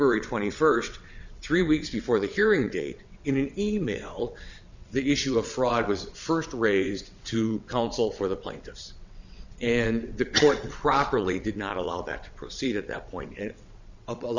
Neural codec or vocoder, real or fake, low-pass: vocoder, 22.05 kHz, 80 mel bands, WaveNeXt; fake; 7.2 kHz